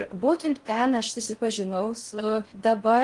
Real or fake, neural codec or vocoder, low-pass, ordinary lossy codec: fake; codec, 16 kHz in and 24 kHz out, 0.6 kbps, FocalCodec, streaming, 4096 codes; 10.8 kHz; Opus, 24 kbps